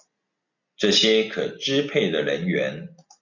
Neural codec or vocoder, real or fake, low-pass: none; real; 7.2 kHz